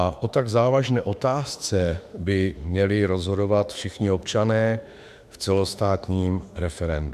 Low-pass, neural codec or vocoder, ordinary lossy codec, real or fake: 14.4 kHz; autoencoder, 48 kHz, 32 numbers a frame, DAC-VAE, trained on Japanese speech; AAC, 96 kbps; fake